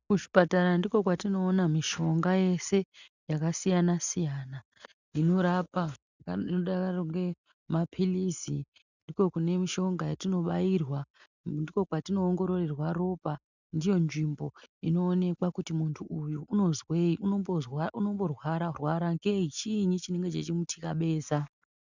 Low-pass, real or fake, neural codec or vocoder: 7.2 kHz; real; none